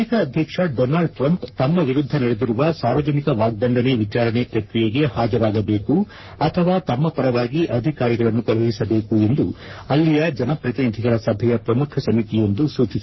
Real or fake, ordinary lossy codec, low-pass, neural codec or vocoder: fake; MP3, 24 kbps; 7.2 kHz; codec, 44.1 kHz, 3.4 kbps, Pupu-Codec